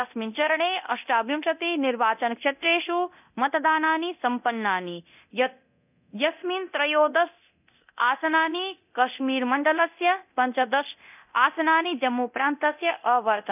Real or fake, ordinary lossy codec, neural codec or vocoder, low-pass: fake; none; codec, 24 kHz, 0.9 kbps, DualCodec; 3.6 kHz